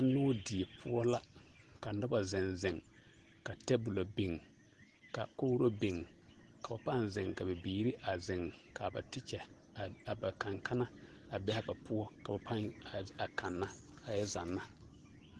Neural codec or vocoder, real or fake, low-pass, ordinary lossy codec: none; real; 10.8 kHz; Opus, 16 kbps